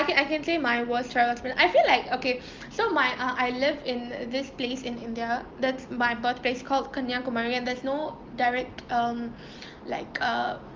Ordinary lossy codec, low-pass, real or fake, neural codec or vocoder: Opus, 32 kbps; 7.2 kHz; real; none